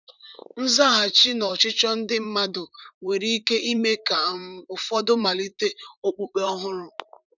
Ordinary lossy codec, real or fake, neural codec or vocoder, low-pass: none; fake; vocoder, 44.1 kHz, 128 mel bands, Pupu-Vocoder; 7.2 kHz